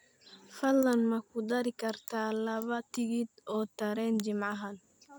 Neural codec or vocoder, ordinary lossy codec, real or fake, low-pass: vocoder, 44.1 kHz, 128 mel bands every 256 samples, BigVGAN v2; none; fake; none